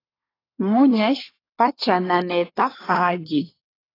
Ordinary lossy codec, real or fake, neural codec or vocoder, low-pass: AAC, 24 kbps; fake; codec, 24 kHz, 1 kbps, SNAC; 5.4 kHz